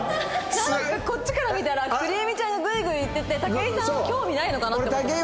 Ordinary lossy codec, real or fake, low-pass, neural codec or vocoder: none; real; none; none